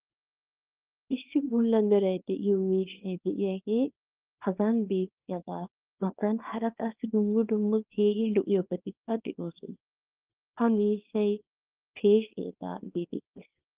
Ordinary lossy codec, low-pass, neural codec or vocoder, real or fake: Opus, 32 kbps; 3.6 kHz; codec, 24 kHz, 0.9 kbps, WavTokenizer, small release; fake